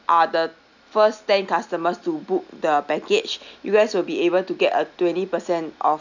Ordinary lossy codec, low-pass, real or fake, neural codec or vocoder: none; 7.2 kHz; real; none